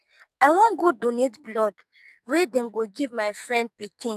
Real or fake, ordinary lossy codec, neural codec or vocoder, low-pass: fake; none; codec, 44.1 kHz, 2.6 kbps, SNAC; 14.4 kHz